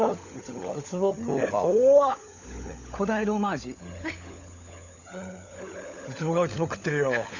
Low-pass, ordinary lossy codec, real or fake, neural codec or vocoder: 7.2 kHz; none; fake; codec, 16 kHz, 16 kbps, FunCodec, trained on LibriTTS, 50 frames a second